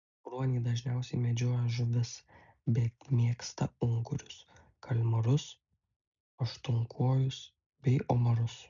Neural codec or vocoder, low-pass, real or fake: none; 7.2 kHz; real